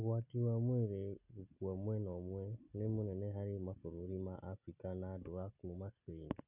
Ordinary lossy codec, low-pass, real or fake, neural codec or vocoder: none; 3.6 kHz; real; none